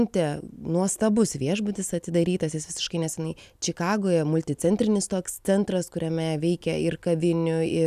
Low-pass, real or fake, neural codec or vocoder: 14.4 kHz; real; none